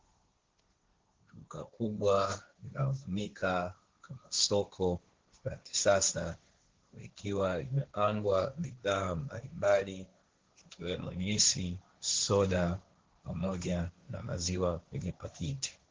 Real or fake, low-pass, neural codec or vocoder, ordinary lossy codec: fake; 7.2 kHz; codec, 16 kHz, 1.1 kbps, Voila-Tokenizer; Opus, 16 kbps